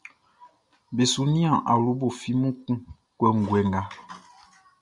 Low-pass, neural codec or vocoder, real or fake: 10.8 kHz; none; real